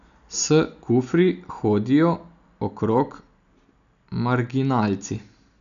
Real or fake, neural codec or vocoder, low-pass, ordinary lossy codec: real; none; 7.2 kHz; none